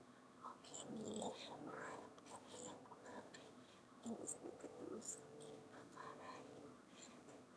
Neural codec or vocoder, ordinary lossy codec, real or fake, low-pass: autoencoder, 22.05 kHz, a latent of 192 numbers a frame, VITS, trained on one speaker; none; fake; none